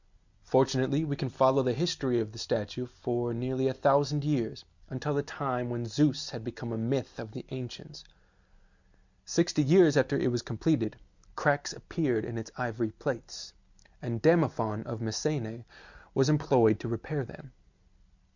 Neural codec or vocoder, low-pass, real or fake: none; 7.2 kHz; real